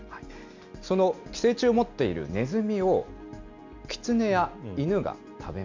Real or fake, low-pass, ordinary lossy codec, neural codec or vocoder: real; 7.2 kHz; MP3, 64 kbps; none